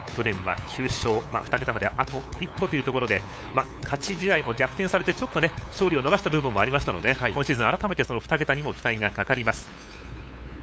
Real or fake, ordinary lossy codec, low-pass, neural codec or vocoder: fake; none; none; codec, 16 kHz, 8 kbps, FunCodec, trained on LibriTTS, 25 frames a second